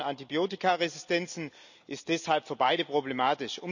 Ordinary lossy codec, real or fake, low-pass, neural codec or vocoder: none; real; 7.2 kHz; none